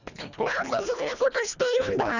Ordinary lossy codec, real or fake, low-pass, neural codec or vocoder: none; fake; 7.2 kHz; codec, 24 kHz, 1.5 kbps, HILCodec